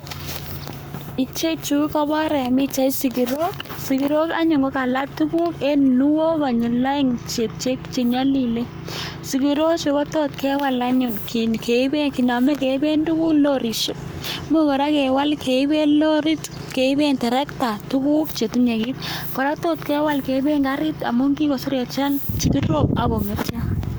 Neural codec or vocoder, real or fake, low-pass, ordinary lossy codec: codec, 44.1 kHz, 7.8 kbps, Pupu-Codec; fake; none; none